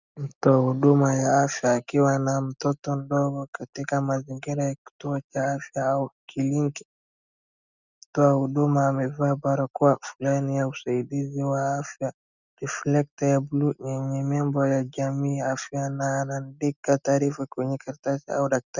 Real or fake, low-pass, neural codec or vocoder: real; 7.2 kHz; none